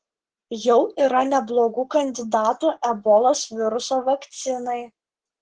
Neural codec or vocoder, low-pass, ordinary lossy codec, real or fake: codec, 44.1 kHz, 7.8 kbps, Pupu-Codec; 9.9 kHz; Opus, 16 kbps; fake